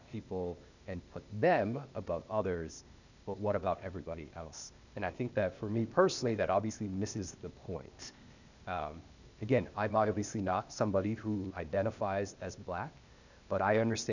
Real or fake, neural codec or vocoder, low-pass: fake; codec, 16 kHz, 0.8 kbps, ZipCodec; 7.2 kHz